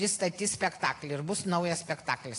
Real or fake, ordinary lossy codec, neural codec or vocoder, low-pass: real; AAC, 48 kbps; none; 10.8 kHz